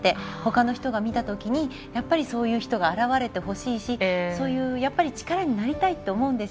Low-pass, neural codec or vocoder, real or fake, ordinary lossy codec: none; none; real; none